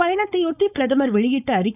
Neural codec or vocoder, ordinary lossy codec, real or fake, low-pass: codec, 16 kHz, 4.8 kbps, FACodec; none; fake; 3.6 kHz